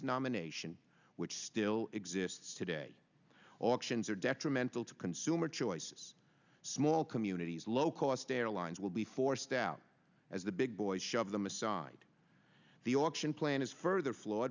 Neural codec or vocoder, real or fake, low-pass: none; real; 7.2 kHz